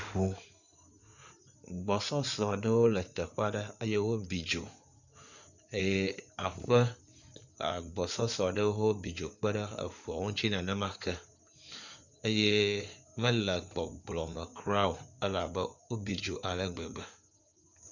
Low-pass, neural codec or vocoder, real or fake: 7.2 kHz; codec, 16 kHz in and 24 kHz out, 2.2 kbps, FireRedTTS-2 codec; fake